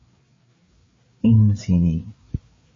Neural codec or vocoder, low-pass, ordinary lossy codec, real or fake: codec, 16 kHz, 4 kbps, FreqCodec, larger model; 7.2 kHz; MP3, 32 kbps; fake